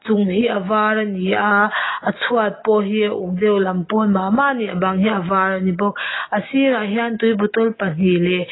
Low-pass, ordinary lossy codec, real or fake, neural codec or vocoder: 7.2 kHz; AAC, 16 kbps; real; none